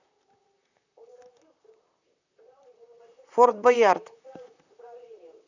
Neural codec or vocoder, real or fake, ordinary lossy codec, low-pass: vocoder, 44.1 kHz, 128 mel bands, Pupu-Vocoder; fake; none; 7.2 kHz